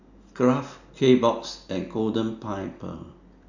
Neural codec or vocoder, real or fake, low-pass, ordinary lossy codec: none; real; 7.2 kHz; none